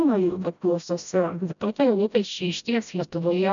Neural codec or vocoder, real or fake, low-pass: codec, 16 kHz, 0.5 kbps, FreqCodec, smaller model; fake; 7.2 kHz